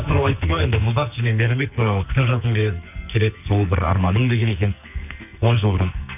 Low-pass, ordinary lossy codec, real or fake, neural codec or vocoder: 3.6 kHz; none; fake; codec, 44.1 kHz, 2.6 kbps, SNAC